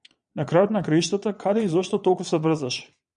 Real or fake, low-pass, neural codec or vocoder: fake; 9.9 kHz; vocoder, 22.05 kHz, 80 mel bands, Vocos